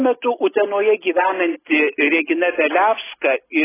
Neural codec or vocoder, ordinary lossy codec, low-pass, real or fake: none; AAC, 16 kbps; 3.6 kHz; real